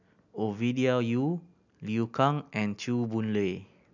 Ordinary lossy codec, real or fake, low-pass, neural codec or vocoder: none; real; 7.2 kHz; none